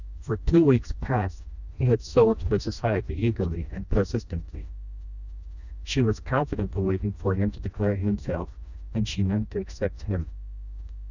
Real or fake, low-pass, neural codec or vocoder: fake; 7.2 kHz; codec, 16 kHz, 1 kbps, FreqCodec, smaller model